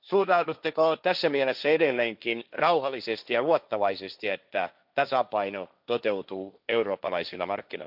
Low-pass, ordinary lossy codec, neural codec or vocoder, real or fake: 5.4 kHz; none; codec, 16 kHz, 1.1 kbps, Voila-Tokenizer; fake